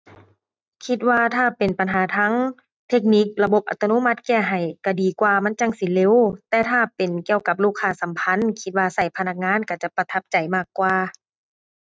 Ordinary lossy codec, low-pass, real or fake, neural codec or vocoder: none; none; real; none